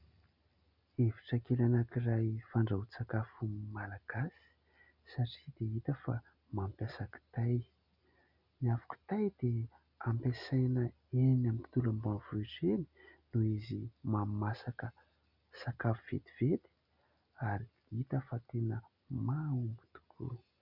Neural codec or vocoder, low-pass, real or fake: none; 5.4 kHz; real